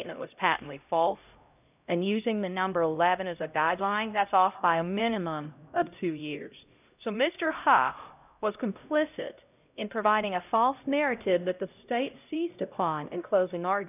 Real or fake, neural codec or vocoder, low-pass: fake; codec, 16 kHz, 0.5 kbps, X-Codec, HuBERT features, trained on LibriSpeech; 3.6 kHz